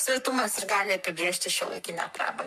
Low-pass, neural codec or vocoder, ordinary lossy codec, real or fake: 14.4 kHz; codec, 44.1 kHz, 3.4 kbps, Pupu-Codec; MP3, 96 kbps; fake